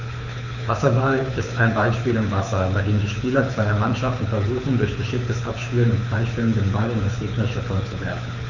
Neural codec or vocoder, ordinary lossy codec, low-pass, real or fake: codec, 24 kHz, 6 kbps, HILCodec; none; 7.2 kHz; fake